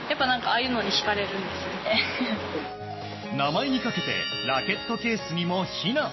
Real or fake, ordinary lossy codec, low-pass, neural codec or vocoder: real; MP3, 24 kbps; 7.2 kHz; none